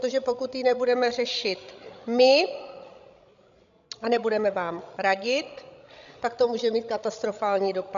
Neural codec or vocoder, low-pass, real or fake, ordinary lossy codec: codec, 16 kHz, 16 kbps, FreqCodec, larger model; 7.2 kHz; fake; AAC, 96 kbps